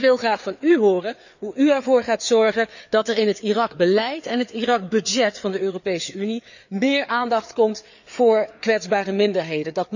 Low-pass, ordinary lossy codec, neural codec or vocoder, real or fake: 7.2 kHz; none; codec, 16 kHz, 4 kbps, FreqCodec, larger model; fake